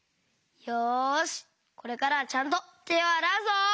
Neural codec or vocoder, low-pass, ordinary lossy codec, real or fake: none; none; none; real